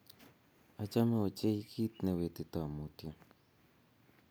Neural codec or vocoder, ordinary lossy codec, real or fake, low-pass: none; none; real; none